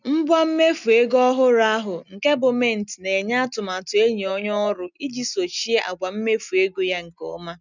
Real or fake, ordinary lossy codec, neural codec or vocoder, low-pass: real; none; none; 7.2 kHz